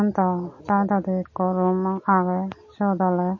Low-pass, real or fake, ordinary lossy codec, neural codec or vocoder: 7.2 kHz; real; MP3, 32 kbps; none